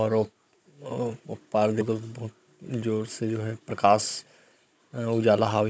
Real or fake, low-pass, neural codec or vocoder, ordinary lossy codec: fake; none; codec, 16 kHz, 16 kbps, FunCodec, trained on Chinese and English, 50 frames a second; none